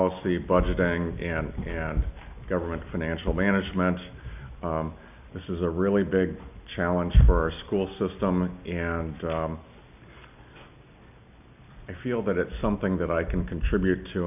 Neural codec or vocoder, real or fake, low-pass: none; real; 3.6 kHz